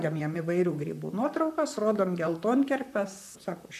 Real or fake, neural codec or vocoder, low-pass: fake; vocoder, 44.1 kHz, 128 mel bands, Pupu-Vocoder; 14.4 kHz